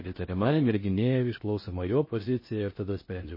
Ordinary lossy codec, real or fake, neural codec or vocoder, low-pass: MP3, 24 kbps; fake; codec, 16 kHz in and 24 kHz out, 0.6 kbps, FocalCodec, streaming, 4096 codes; 5.4 kHz